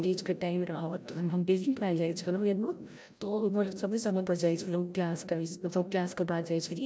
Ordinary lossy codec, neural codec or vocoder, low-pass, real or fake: none; codec, 16 kHz, 0.5 kbps, FreqCodec, larger model; none; fake